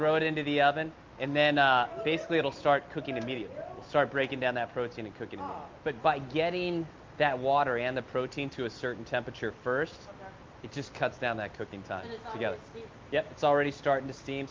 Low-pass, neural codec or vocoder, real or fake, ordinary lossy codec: 7.2 kHz; none; real; Opus, 24 kbps